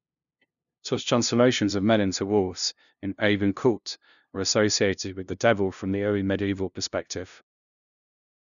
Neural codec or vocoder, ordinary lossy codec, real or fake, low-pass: codec, 16 kHz, 0.5 kbps, FunCodec, trained on LibriTTS, 25 frames a second; none; fake; 7.2 kHz